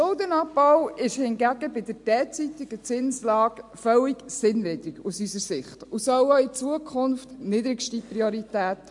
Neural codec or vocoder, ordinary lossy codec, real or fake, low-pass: none; MP3, 64 kbps; real; 10.8 kHz